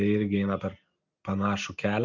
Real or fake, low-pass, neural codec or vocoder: real; 7.2 kHz; none